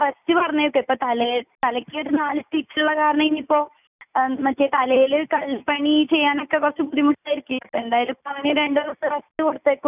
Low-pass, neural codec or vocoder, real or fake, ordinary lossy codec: 3.6 kHz; none; real; none